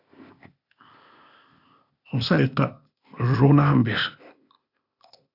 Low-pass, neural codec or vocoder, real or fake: 5.4 kHz; codec, 16 kHz, 0.8 kbps, ZipCodec; fake